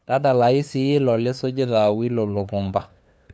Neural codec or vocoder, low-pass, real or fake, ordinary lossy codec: codec, 16 kHz, 2 kbps, FunCodec, trained on LibriTTS, 25 frames a second; none; fake; none